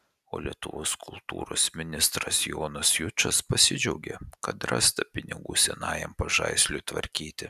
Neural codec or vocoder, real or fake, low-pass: none; real; 14.4 kHz